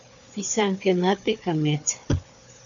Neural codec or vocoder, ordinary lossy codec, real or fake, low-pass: codec, 16 kHz, 4 kbps, FunCodec, trained on Chinese and English, 50 frames a second; MP3, 96 kbps; fake; 7.2 kHz